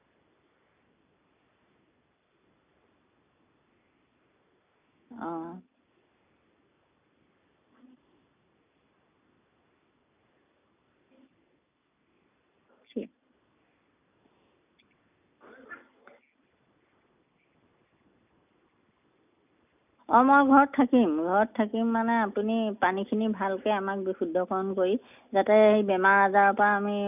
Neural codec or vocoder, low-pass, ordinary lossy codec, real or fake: none; 3.6 kHz; none; real